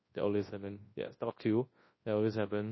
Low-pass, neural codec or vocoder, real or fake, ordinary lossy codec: 7.2 kHz; codec, 24 kHz, 0.9 kbps, WavTokenizer, large speech release; fake; MP3, 24 kbps